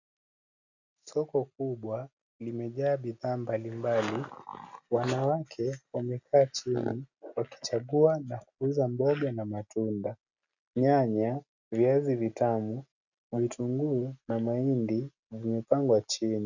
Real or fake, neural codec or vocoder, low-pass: real; none; 7.2 kHz